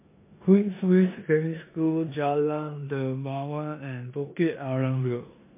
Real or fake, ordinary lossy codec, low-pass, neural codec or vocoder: fake; MP3, 24 kbps; 3.6 kHz; codec, 16 kHz in and 24 kHz out, 0.9 kbps, LongCat-Audio-Codec, four codebook decoder